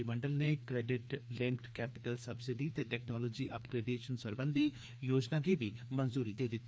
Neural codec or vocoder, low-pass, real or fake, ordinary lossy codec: codec, 16 kHz, 2 kbps, FreqCodec, larger model; none; fake; none